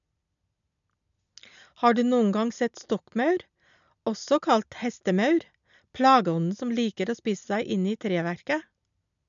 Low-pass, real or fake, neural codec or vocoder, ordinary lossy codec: 7.2 kHz; real; none; none